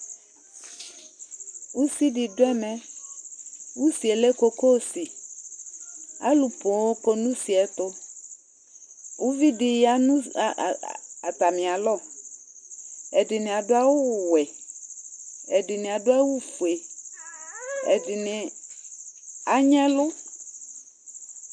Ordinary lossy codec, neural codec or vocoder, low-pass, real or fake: Opus, 64 kbps; none; 9.9 kHz; real